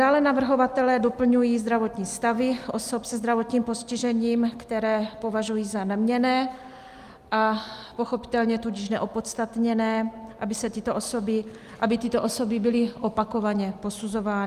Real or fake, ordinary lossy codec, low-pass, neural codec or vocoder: real; Opus, 32 kbps; 14.4 kHz; none